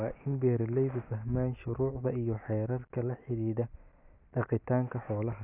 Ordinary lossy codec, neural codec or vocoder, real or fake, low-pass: none; none; real; 3.6 kHz